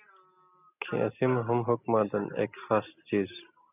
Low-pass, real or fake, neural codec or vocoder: 3.6 kHz; real; none